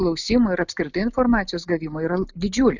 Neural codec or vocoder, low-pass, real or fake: none; 7.2 kHz; real